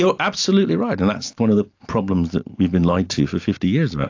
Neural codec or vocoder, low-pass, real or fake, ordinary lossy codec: none; 7.2 kHz; real; AAC, 48 kbps